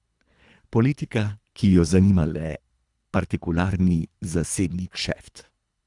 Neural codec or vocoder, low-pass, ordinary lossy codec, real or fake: codec, 24 kHz, 3 kbps, HILCodec; 10.8 kHz; Opus, 64 kbps; fake